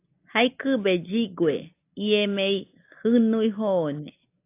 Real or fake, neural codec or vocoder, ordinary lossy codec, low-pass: real; none; AAC, 24 kbps; 3.6 kHz